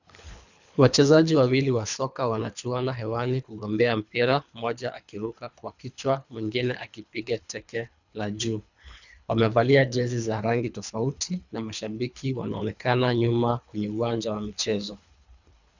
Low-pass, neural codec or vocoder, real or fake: 7.2 kHz; codec, 24 kHz, 3 kbps, HILCodec; fake